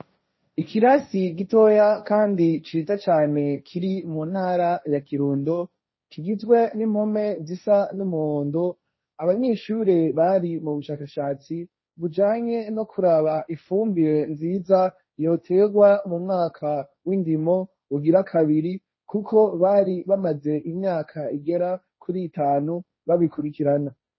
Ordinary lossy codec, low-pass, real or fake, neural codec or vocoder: MP3, 24 kbps; 7.2 kHz; fake; codec, 16 kHz, 1.1 kbps, Voila-Tokenizer